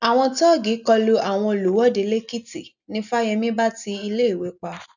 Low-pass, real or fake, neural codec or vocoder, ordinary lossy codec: 7.2 kHz; real; none; none